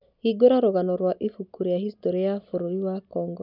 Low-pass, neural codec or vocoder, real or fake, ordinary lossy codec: 5.4 kHz; none; real; none